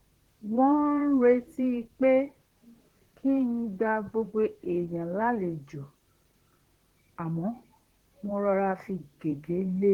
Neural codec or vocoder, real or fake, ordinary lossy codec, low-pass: vocoder, 44.1 kHz, 128 mel bands, Pupu-Vocoder; fake; Opus, 16 kbps; 19.8 kHz